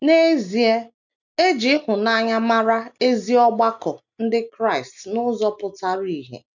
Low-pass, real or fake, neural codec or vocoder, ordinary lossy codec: 7.2 kHz; real; none; none